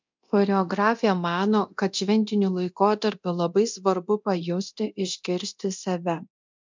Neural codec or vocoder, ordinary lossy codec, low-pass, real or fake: codec, 24 kHz, 0.9 kbps, DualCodec; MP3, 64 kbps; 7.2 kHz; fake